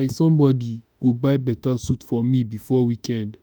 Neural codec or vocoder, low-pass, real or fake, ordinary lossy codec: autoencoder, 48 kHz, 32 numbers a frame, DAC-VAE, trained on Japanese speech; none; fake; none